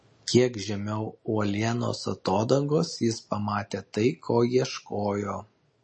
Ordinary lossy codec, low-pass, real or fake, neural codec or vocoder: MP3, 32 kbps; 9.9 kHz; real; none